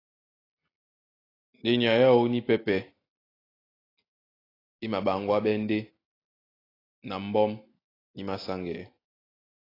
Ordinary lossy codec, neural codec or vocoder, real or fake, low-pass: AAC, 24 kbps; none; real; 5.4 kHz